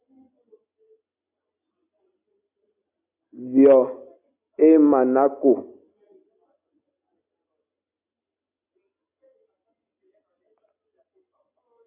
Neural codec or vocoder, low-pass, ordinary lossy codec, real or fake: none; 3.6 kHz; AAC, 32 kbps; real